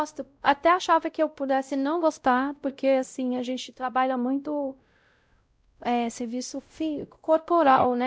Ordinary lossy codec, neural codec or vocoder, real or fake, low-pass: none; codec, 16 kHz, 0.5 kbps, X-Codec, WavLM features, trained on Multilingual LibriSpeech; fake; none